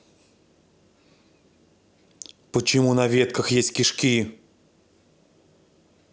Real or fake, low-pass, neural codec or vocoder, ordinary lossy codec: real; none; none; none